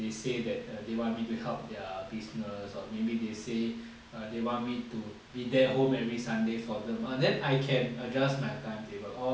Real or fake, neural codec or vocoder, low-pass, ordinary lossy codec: real; none; none; none